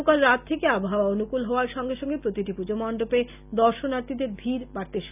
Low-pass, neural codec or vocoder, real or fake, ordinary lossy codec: 3.6 kHz; none; real; none